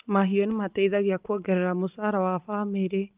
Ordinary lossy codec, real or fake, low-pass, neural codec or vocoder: Opus, 32 kbps; real; 3.6 kHz; none